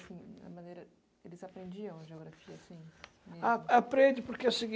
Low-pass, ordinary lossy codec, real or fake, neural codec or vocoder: none; none; real; none